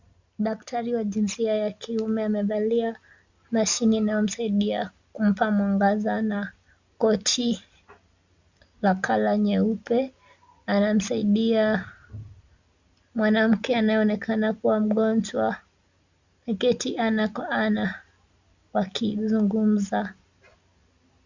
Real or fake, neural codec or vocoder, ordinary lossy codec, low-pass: real; none; Opus, 64 kbps; 7.2 kHz